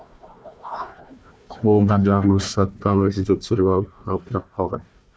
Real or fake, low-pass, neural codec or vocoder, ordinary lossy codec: fake; none; codec, 16 kHz, 1 kbps, FunCodec, trained on Chinese and English, 50 frames a second; none